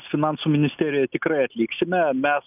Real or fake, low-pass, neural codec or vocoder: real; 3.6 kHz; none